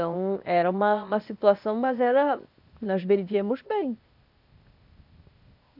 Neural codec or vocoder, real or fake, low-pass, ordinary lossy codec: codec, 16 kHz, 0.8 kbps, ZipCodec; fake; 5.4 kHz; none